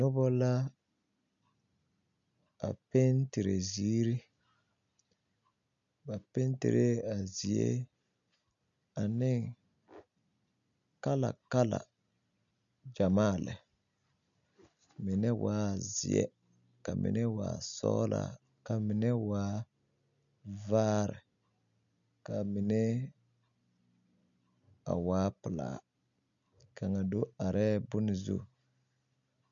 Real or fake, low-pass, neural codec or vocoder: real; 7.2 kHz; none